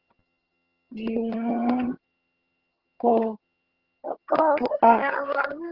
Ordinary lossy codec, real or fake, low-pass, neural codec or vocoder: Opus, 16 kbps; fake; 5.4 kHz; vocoder, 22.05 kHz, 80 mel bands, HiFi-GAN